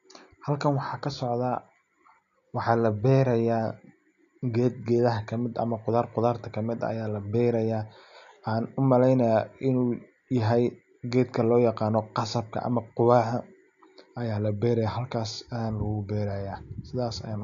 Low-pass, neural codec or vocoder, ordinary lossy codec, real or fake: 7.2 kHz; none; none; real